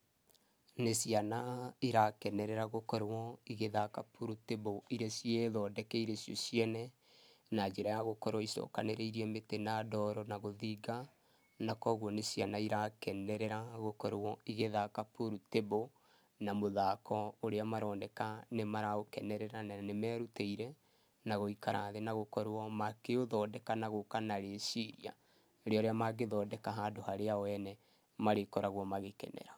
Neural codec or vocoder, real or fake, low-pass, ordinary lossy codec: none; real; none; none